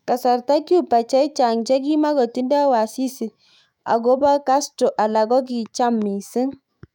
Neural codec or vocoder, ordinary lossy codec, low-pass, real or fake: autoencoder, 48 kHz, 128 numbers a frame, DAC-VAE, trained on Japanese speech; none; 19.8 kHz; fake